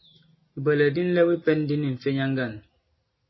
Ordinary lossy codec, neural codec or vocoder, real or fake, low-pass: MP3, 24 kbps; none; real; 7.2 kHz